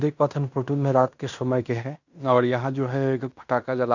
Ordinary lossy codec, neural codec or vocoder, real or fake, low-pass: none; codec, 16 kHz in and 24 kHz out, 0.9 kbps, LongCat-Audio-Codec, fine tuned four codebook decoder; fake; 7.2 kHz